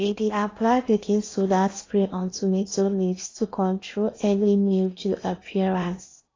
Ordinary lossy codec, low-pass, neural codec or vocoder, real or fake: AAC, 32 kbps; 7.2 kHz; codec, 16 kHz in and 24 kHz out, 0.8 kbps, FocalCodec, streaming, 65536 codes; fake